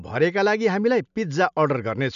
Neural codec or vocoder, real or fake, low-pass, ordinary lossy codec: none; real; 7.2 kHz; none